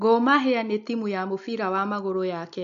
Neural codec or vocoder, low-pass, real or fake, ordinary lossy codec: none; 7.2 kHz; real; MP3, 48 kbps